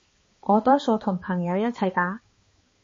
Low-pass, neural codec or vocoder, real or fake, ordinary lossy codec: 7.2 kHz; codec, 16 kHz, 2 kbps, X-Codec, HuBERT features, trained on balanced general audio; fake; MP3, 32 kbps